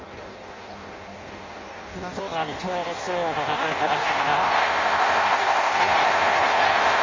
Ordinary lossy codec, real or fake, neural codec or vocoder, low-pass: Opus, 32 kbps; fake; codec, 16 kHz in and 24 kHz out, 0.6 kbps, FireRedTTS-2 codec; 7.2 kHz